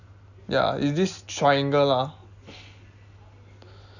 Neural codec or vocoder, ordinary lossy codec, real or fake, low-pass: none; none; real; 7.2 kHz